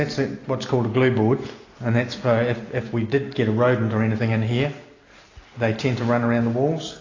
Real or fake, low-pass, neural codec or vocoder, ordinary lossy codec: real; 7.2 kHz; none; AAC, 32 kbps